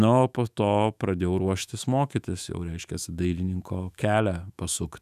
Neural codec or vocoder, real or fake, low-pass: autoencoder, 48 kHz, 128 numbers a frame, DAC-VAE, trained on Japanese speech; fake; 14.4 kHz